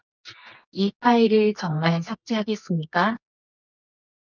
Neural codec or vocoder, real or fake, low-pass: codec, 24 kHz, 0.9 kbps, WavTokenizer, medium music audio release; fake; 7.2 kHz